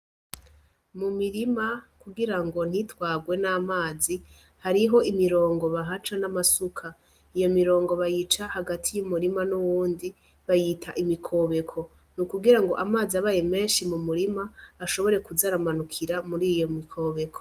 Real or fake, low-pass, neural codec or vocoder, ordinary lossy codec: real; 14.4 kHz; none; Opus, 32 kbps